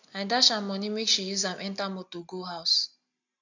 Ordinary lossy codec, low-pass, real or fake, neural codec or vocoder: none; 7.2 kHz; real; none